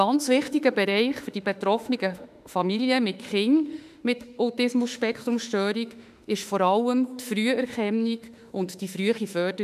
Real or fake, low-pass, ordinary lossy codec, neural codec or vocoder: fake; 14.4 kHz; none; autoencoder, 48 kHz, 32 numbers a frame, DAC-VAE, trained on Japanese speech